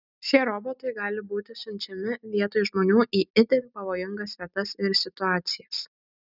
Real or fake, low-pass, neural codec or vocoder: real; 5.4 kHz; none